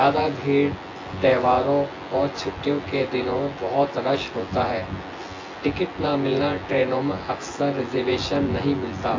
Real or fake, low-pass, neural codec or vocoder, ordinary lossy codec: fake; 7.2 kHz; vocoder, 24 kHz, 100 mel bands, Vocos; AAC, 32 kbps